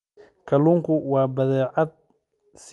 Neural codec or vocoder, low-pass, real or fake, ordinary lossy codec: none; 10.8 kHz; real; Opus, 32 kbps